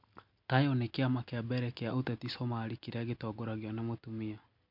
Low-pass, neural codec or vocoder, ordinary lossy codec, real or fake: 5.4 kHz; none; MP3, 48 kbps; real